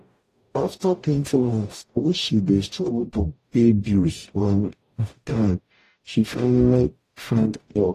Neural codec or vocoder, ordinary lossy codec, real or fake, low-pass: codec, 44.1 kHz, 0.9 kbps, DAC; AAC, 48 kbps; fake; 14.4 kHz